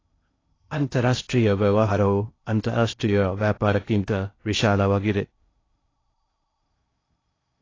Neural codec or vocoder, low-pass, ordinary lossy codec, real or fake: codec, 16 kHz in and 24 kHz out, 0.6 kbps, FocalCodec, streaming, 2048 codes; 7.2 kHz; AAC, 32 kbps; fake